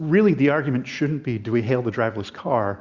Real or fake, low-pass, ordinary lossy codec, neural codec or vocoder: real; 7.2 kHz; Opus, 64 kbps; none